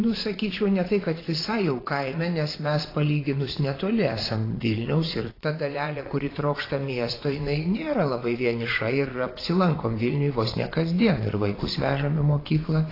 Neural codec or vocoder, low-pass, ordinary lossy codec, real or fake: vocoder, 22.05 kHz, 80 mel bands, Vocos; 5.4 kHz; AAC, 24 kbps; fake